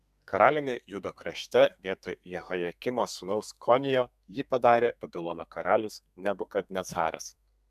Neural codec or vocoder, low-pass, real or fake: codec, 32 kHz, 1.9 kbps, SNAC; 14.4 kHz; fake